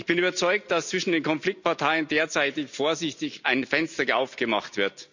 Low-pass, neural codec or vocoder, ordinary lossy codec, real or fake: 7.2 kHz; none; none; real